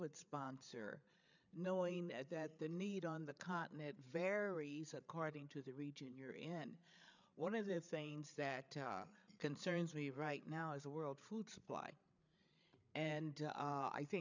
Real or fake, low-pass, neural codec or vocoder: fake; 7.2 kHz; codec, 16 kHz, 8 kbps, FreqCodec, larger model